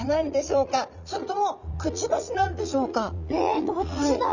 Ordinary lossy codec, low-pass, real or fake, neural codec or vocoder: none; 7.2 kHz; fake; vocoder, 44.1 kHz, 80 mel bands, Vocos